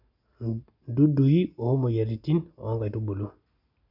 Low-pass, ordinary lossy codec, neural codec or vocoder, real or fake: 5.4 kHz; none; none; real